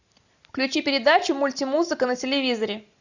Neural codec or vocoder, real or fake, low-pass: none; real; 7.2 kHz